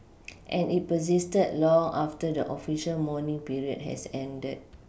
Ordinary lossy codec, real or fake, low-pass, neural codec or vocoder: none; real; none; none